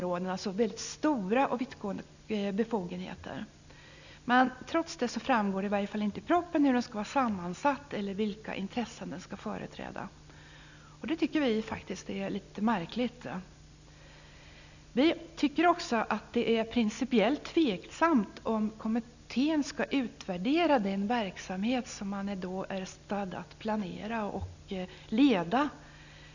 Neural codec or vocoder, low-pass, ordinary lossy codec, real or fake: none; 7.2 kHz; none; real